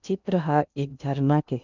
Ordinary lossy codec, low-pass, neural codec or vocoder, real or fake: none; 7.2 kHz; codec, 16 kHz in and 24 kHz out, 0.8 kbps, FocalCodec, streaming, 65536 codes; fake